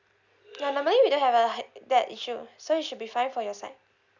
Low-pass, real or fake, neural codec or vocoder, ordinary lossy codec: 7.2 kHz; real; none; none